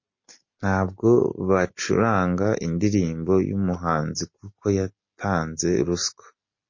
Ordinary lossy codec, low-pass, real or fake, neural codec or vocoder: MP3, 32 kbps; 7.2 kHz; real; none